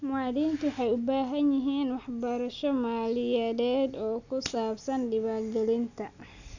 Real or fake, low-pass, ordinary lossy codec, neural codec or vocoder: real; 7.2 kHz; none; none